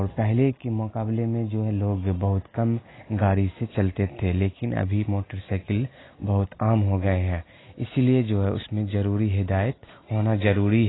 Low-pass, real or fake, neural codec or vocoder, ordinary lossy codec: 7.2 kHz; real; none; AAC, 16 kbps